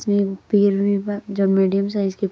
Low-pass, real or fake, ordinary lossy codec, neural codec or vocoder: none; fake; none; codec, 16 kHz, 6 kbps, DAC